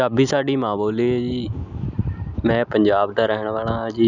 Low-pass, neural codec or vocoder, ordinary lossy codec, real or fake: 7.2 kHz; none; none; real